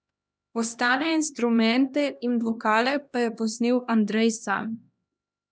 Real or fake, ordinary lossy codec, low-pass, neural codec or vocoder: fake; none; none; codec, 16 kHz, 1 kbps, X-Codec, HuBERT features, trained on LibriSpeech